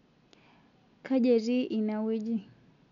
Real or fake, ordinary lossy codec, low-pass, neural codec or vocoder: real; none; 7.2 kHz; none